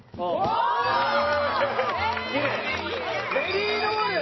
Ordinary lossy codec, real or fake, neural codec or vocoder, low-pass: MP3, 24 kbps; real; none; 7.2 kHz